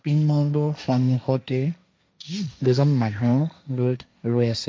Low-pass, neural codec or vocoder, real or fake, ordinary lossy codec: none; codec, 16 kHz, 1.1 kbps, Voila-Tokenizer; fake; none